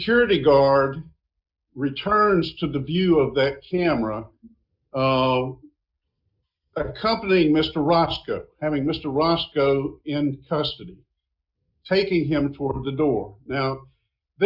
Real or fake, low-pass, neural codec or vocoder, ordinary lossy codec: real; 5.4 kHz; none; Opus, 64 kbps